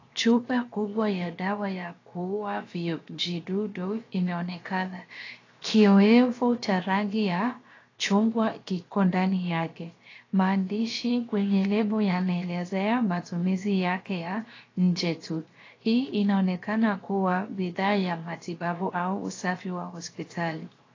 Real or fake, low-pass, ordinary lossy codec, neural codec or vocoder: fake; 7.2 kHz; AAC, 32 kbps; codec, 16 kHz, 0.7 kbps, FocalCodec